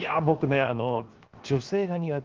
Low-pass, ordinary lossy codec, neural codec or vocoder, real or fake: 7.2 kHz; Opus, 32 kbps; codec, 16 kHz, 0.7 kbps, FocalCodec; fake